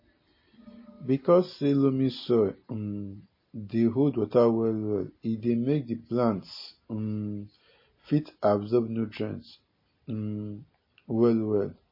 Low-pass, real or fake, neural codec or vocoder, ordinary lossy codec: 5.4 kHz; real; none; MP3, 24 kbps